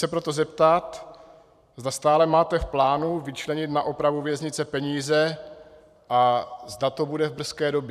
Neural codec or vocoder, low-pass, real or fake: vocoder, 44.1 kHz, 128 mel bands every 512 samples, BigVGAN v2; 14.4 kHz; fake